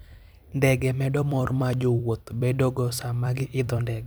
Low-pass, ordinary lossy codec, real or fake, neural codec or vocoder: none; none; fake; vocoder, 44.1 kHz, 128 mel bands every 256 samples, BigVGAN v2